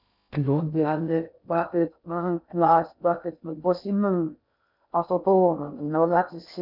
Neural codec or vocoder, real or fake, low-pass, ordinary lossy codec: codec, 16 kHz in and 24 kHz out, 0.6 kbps, FocalCodec, streaming, 2048 codes; fake; 5.4 kHz; none